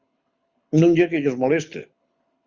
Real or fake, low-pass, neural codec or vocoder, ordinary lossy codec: fake; 7.2 kHz; codec, 24 kHz, 6 kbps, HILCodec; Opus, 64 kbps